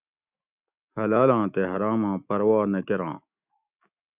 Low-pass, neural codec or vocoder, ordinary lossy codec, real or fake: 3.6 kHz; autoencoder, 48 kHz, 128 numbers a frame, DAC-VAE, trained on Japanese speech; Opus, 64 kbps; fake